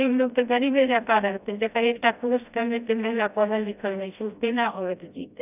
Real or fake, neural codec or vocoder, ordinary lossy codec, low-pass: fake; codec, 16 kHz, 1 kbps, FreqCodec, smaller model; none; 3.6 kHz